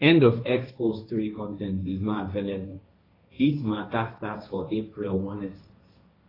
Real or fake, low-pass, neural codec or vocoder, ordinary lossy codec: fake; 5.4 kHz; codec, 16 kHz, 1.1 kbps, Voila-Tokenizer; AAC, 24 kbps